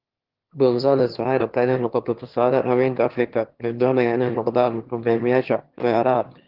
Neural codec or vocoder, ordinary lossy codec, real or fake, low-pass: autoencoder, 22.05 kHz, a latent of 192 numbers a frame, VITS, trained on one speaker; Opus, 16 kbps; fake; 5.4 kHz